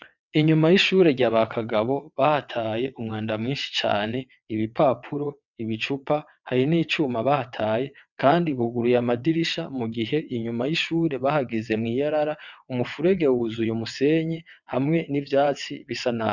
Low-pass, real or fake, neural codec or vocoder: 7.2 kHz; fake; vocoder, 22.05 kHz, 80 mel bands, WaveNeXt